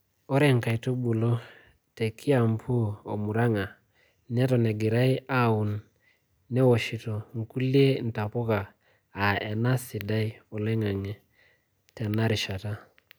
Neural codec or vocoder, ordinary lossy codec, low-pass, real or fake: none; none; none; real